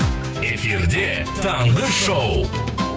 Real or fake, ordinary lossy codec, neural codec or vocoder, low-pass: fake; none; codec, 16 kHz, 6 kbps, DAC; none